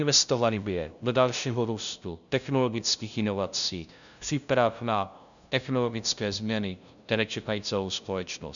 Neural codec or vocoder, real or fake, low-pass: codec, 16 kHz, 0.5 kbps, FunCodec, trained on LibriTTS, 25 frames a second; fake; 7.2 kHz